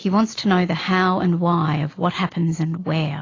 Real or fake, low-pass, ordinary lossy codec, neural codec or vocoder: real; 7.2 kHz; AAC, 32 kbps; none